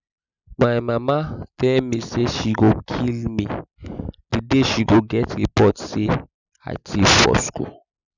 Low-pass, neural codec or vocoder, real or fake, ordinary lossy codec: 7.2 kHz; none; real; none